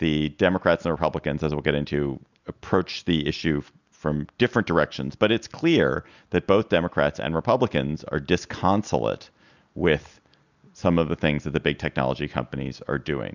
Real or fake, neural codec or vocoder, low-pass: real; none; 7.2 kHz